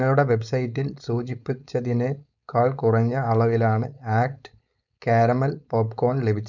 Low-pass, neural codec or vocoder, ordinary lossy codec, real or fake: 7.2 kHz; codec, 16 kHz, 4.8 kbps, FACodec; none; fake